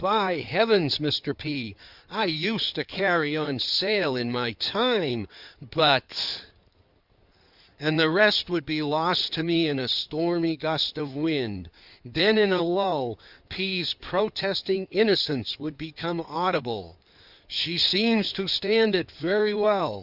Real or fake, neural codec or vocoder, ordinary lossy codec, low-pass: fake; codec, 16 kHz in and 24 kHz out, 2.2 kbps, FireRedTTS-2 codec; Opus, 64 kbps; 5.4 kHz